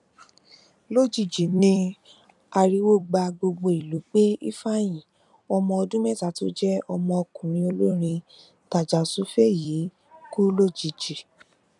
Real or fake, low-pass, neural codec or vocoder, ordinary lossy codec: fake; 10.8 kHz; vocoder, 44.1 kHz, 128 mel bands every 256 samples, BigVGAN v2; none